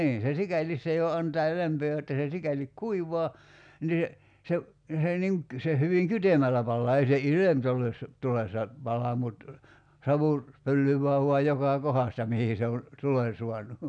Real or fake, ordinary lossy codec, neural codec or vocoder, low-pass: real; none; none; 9.9 kHz